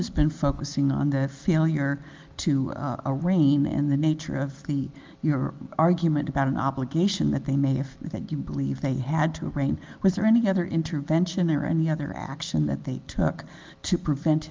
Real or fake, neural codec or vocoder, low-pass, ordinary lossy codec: real; none; 7.2 kHz; Opus, 32 kbps